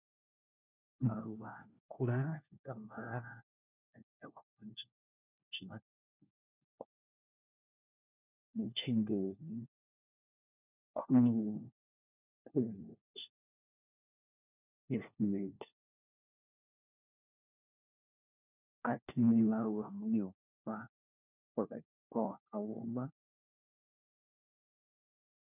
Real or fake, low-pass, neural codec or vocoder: fake; 3.6 kHz; codec, 16 kHz, 1 kbps, FunCodec, trained on LibriTTS, 50 frames a second